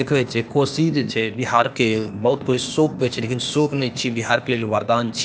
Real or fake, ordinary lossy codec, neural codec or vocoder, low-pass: fake; none; codec, 16 kHz, 0.8 kbps, ZipCodec; none